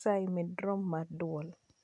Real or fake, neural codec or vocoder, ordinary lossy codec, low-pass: real; none; MP3, 64 kbps; 10.8 kHz